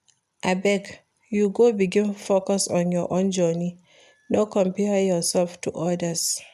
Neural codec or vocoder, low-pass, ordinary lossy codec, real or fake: none; 14.4 kHz; none; real